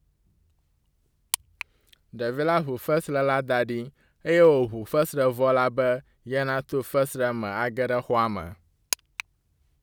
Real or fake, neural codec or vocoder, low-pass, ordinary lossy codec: real; none; none; none